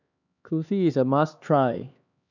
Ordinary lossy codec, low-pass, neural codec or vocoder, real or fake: none; 7.2 kHz; codec, 16 kHz, 2 kbps, X-Codec, HuBERT features, trained on LibriSpeech; fake